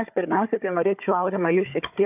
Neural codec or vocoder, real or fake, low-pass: codec, 16 kHz, 4 kbps, FunCodec, trained on Chinese and English, 50 frames a second; fake; 3.6 kHz